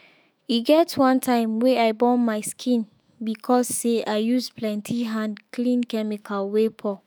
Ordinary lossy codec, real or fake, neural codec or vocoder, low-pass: none; fake; autoencoder, 48 kHz, 128 numbers a frame, DAC-VAE, trained on Japanese speech; none